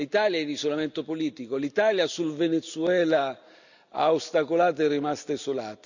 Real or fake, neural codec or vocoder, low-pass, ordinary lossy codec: real; none; 7.2 kHz; none